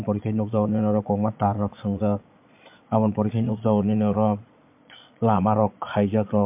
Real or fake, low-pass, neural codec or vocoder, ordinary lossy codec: fake; 3.6 kHz; vocoder, 22.05 kHz, 80 mel bands, Vocos; MP3, 32 kbps